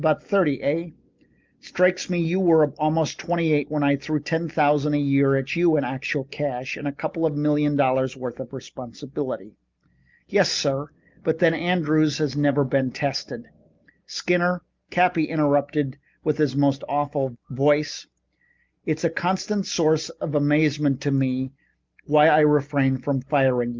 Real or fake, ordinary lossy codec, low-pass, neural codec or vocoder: real; Opus, 32 kbps; 7.2 kHz; none